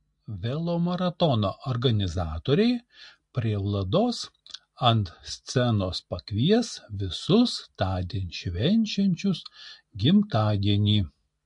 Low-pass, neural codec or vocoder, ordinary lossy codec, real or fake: 10.8 kHz; none; MP3, 48 kbps; real